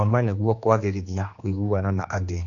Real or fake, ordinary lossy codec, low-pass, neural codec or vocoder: fake; AAC, 48 kbps; 7.2 kHz; codec, 16 kHz, 2 kbps, X-Codec, HuBERT features, trained on general audio